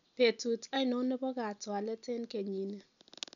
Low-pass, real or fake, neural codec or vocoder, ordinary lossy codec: 7.2 kHz; real; none; none